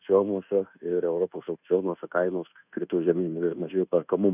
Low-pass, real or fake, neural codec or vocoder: 3.6 kHz; fake; codec, 24 kHz, 1.2 kbps, DualCodec